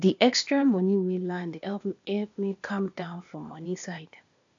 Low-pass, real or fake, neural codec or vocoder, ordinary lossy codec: 7.2 kHz; fake; codec, 16 kHz, 0.8 kbps, ZipCodec; MP3, 64 kbps